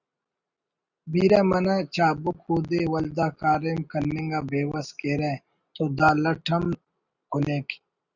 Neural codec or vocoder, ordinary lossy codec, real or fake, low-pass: none; Opus, 64 kbps; real; 7.2 kHz